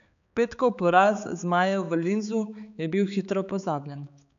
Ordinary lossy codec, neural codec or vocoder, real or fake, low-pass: none; codec, 16 kHz, 4 kbps, X-Codec, HuBERT features, trained on balanced general audio; fake; 7.2 kHz